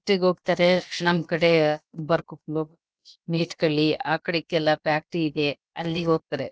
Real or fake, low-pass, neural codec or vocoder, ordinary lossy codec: fake; none; codec, 16 kHz, about 1 kbps, DyCAST, with the encoder's durations; none